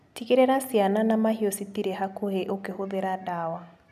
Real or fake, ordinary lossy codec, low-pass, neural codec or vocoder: real; none; 14.4 kHz; none